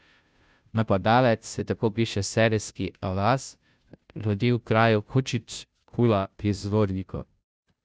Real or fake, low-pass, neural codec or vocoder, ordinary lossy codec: fake; none; codec, 16 kHz, 0.5 kbps, FunCodec, trained on Chinese and English, 25 frames a second; none